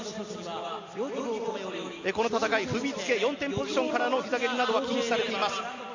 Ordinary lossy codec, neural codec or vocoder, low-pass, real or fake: AAC, 48 kbps; none; 7.2 kHz; real